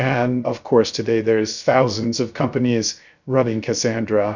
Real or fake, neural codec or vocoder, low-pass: fake; codec, 16 kHz, 0.3 kbps, FocalCodec; 7.2 kHz